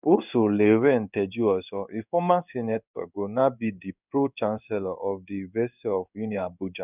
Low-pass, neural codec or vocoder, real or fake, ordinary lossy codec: 3.6 kHz; codec, 16 kHz in and 24 kHz out, 1 kbps, XY-Tokenizer; fake; none